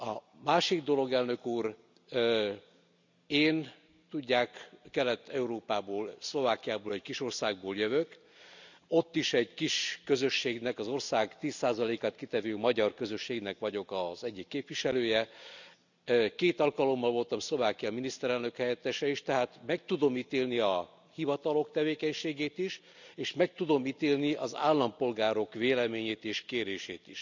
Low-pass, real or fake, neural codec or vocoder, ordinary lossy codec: 7.2 kHz; real; none; none